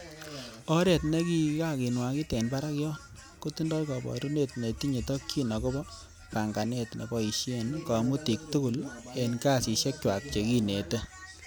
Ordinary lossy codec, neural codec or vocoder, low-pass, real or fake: none; none; none; real